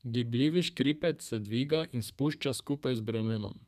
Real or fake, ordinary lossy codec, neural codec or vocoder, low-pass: fake; none; codec, 32 kHz, 1.9 kbps, SNAC; 14.4 kHz